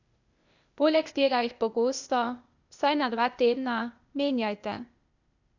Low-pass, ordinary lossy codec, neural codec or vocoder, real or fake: 7.2 kHz; none; codec, 16 kHz, 0.8 kbps, ZipCodec; fake